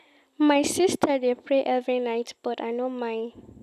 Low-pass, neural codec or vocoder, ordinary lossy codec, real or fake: 14.4 kHz; none; none; real